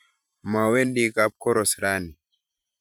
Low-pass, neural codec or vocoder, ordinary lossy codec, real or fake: none; vocoder, 44.1 kHz, 128 mel bands every 512 samples, BigVGAN v2; none; fake